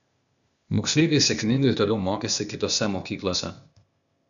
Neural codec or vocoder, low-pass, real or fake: codec, 16 kHz, 0.8 kbps, ZipCodec; 7.2 kHz; fake